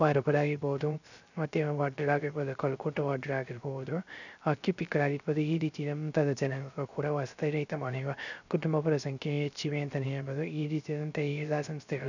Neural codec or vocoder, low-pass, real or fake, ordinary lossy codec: codec, 16 kHz, 0.3 kbps, FocalCodec; 7.2 kHz; fake; none